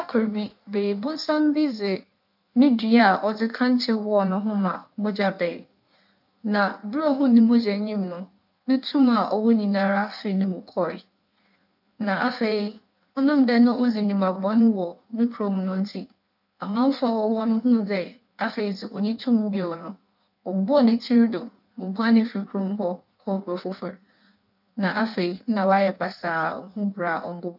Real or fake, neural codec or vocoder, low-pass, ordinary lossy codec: fake; codec, 16 kHz in and 24 kHz out, 1.1 kbps, FireRedTTS-2 codec; 5.4 kHz; none